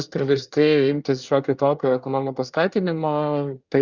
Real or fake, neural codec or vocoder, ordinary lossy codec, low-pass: fake; codec, 24 kHz, 1 kbps, SNAC; Opus, 64 kbps; 7.2 kHz